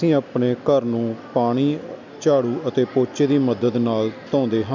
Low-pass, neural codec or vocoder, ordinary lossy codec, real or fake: 7.2 kHz; none; none; real